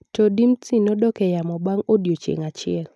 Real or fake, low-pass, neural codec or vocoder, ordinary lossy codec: real; none; none; none